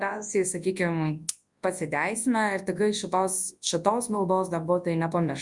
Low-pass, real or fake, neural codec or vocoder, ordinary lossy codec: 10.8 kHz; fake; codec, 24 kHz, 0.9 kbps, WavTokenizer, large speech release; Opus, 64 kbps